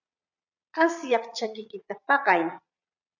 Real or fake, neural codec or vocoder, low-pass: fake; vocoder, 22.05 kHz, 80 mel bands, Vocos; 7.2 kHz